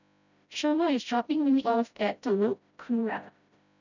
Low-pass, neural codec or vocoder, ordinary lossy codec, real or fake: 7.2 kHz; codec, 16 kHz, 0.5 kbps, FreqCodec, smaller model; none; fake